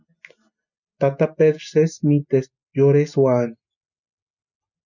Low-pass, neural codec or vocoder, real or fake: 7.2 kHz; none; real